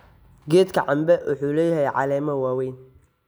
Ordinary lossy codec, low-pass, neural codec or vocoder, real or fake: none; none; none; real